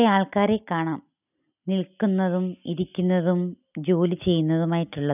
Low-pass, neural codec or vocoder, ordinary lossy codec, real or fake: 3.6 kHz; none; none; real